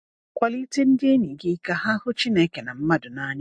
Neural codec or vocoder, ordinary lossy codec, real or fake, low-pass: none; MP3, 48 kbps; real; 9.9 kHz